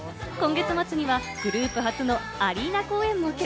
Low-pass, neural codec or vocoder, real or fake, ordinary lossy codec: none; none; real; none